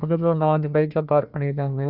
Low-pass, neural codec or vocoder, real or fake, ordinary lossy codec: 5.4 kHz; codec, 16 kHz, 1 kbps, FunCodec, trained on Chinese and English, 50 frames a second; fake; Opus, 64 kbps